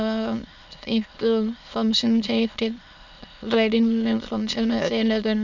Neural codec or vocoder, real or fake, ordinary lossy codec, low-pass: autoencoder, 22.05 kHz, a latent of 192 numbers a frame, VITS, trained on many speakers; fake; none; 7.2 kHz